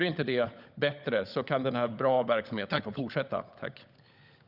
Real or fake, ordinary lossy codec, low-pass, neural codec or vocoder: fake; Opus, 64 kbps; 5.4 kHz; codec, 16 kHz, 16 kbps, FunCodec, trained on LibriTTS, 50 frames a second